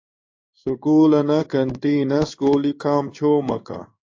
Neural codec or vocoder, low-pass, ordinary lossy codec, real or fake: codec, 16 kHz in and 24 kHz out, 1 kbps, XY-Tokenizer; 7.2 kHz; AAC, 48 kbps; fake